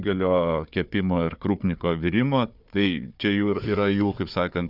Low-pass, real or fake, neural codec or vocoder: 5.4 kHz; fake; codec, 16 kHz, 4 kbps, FunCodec, trained on Chinese and English, 50 frames a second